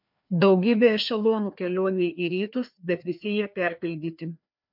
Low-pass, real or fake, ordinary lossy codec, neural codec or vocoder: 5.4 kHz; fake; MP3, 48 kbps; codec, 16 kHz, 2 kbps, FreqCodec, larger model